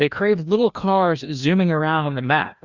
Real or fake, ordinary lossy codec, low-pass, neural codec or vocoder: fake; Opus, 64 kbps; 7.2 kHz; codec, 16 kHz, 1 kbps, FreqCodec, larger model